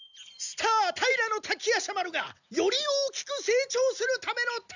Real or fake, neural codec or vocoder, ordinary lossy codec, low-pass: real; none; none; 7.2 kHz